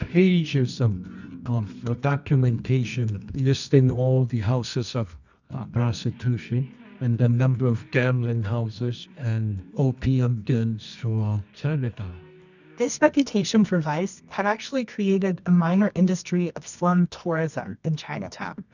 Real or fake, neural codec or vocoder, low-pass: fake; codec, 24 kHz, 0.9 kbps, WavTokenizer, medium music audio release; 7.2 kHz